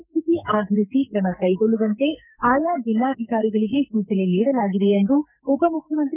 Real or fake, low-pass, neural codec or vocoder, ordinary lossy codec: fake; 3.6 kHz; codec, 44.1 kHz, 2.6 kbps, SNAC; none